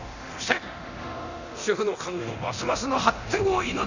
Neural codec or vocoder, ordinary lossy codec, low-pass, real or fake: codec, 24 kHz, 0.9 kbps, DualCodec; none; 7.2 kHz; fake